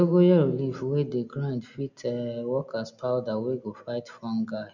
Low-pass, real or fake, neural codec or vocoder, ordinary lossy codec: 7.2 kHz; real; none; none